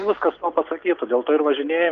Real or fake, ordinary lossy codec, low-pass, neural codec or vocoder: real; Opus, 24 kbps; 7.2 kHz; none